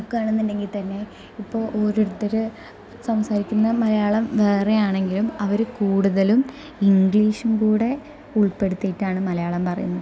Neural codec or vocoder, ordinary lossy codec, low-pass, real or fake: none; none; none; real